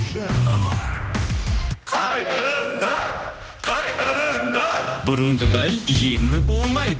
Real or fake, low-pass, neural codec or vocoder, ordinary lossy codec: fake; none; codec, 16 kHz, 1 kbps, X-Codec, HuBERT features, trained on general audio; none